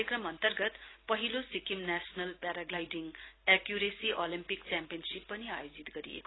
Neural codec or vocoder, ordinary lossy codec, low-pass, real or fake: none; AAC, 16 kbps; 7.2 kHz; real